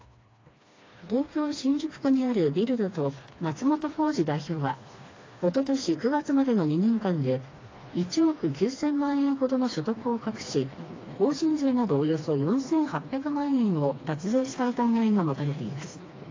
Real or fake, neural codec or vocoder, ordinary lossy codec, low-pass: fake; codec, 16 kHz, 2 kbps, FreqCodec, smaller model; AAC, 32 kbps; 7.2 kHz